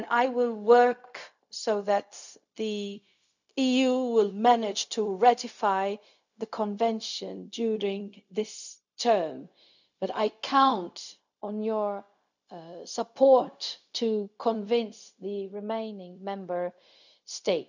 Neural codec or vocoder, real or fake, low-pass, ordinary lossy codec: codec, 16 kHz, 0.4 kbps, LongCat-Audio-Codec; fake; 7.2 kHz; none